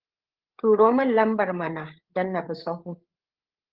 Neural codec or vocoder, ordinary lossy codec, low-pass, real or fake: codec, 16 kHz, 8 kbps, FreqCodec, larger model; Opus, 16 kbps; 5.4 kHz; fake